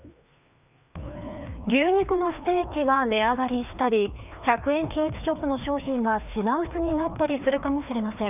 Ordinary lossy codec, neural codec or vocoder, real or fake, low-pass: none; codec, 16 kHz, 2 kbps, FreqCodec, larger model; fake; 3.6 kHz